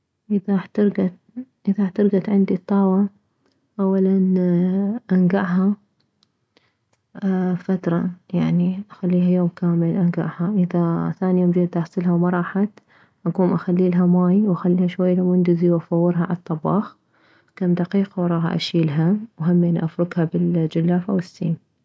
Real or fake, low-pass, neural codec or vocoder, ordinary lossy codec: real; none; none; none